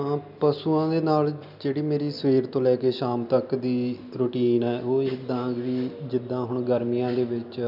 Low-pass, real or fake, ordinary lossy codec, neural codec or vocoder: 5.4 kHz; real; none; none